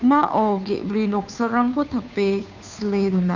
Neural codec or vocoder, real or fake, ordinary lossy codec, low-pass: codec, 16 kHz, 2 kbps, FunCodec, trained on Chinese and English, 25 frames a second; fake; none; 7.2 kHz